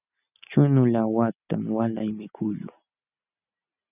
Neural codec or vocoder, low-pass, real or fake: none; 3.6 kHz; real